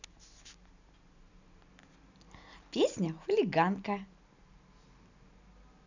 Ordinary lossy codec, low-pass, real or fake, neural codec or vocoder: none; 7.2 kHz; real; none